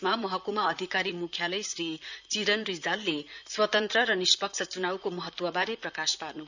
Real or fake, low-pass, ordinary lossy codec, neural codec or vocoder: fake; 7.2 kHz; none; vocoder, 44.1 kHz, 128 mel bands, Pupu-Vocoder